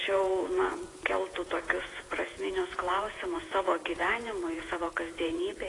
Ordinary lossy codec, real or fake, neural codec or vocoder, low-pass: AAC, 32 kbps; fake; vocoder, 48 kHz, 128 mel bands, Vocos; 10.8 kHz